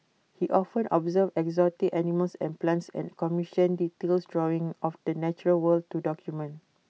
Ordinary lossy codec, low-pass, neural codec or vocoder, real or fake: none; none; none; real